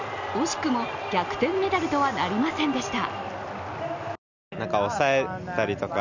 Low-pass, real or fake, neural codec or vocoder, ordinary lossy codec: 7.2 kHz; real; none; none